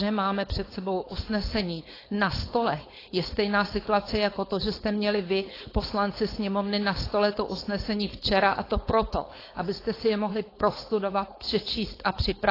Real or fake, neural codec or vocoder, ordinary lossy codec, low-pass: fake; codec, 16 kHz, 4.8 kbps, FACodec; AAC, 24 kbps; 5.4 kHz